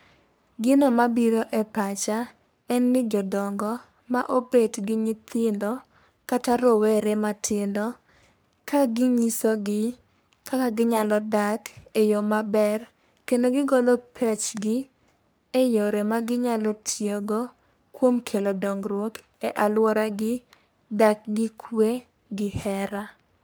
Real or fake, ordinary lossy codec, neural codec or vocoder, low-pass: fake; none; codec, 44.1 kHz, 3.4 kbps, Pupu-Codec; none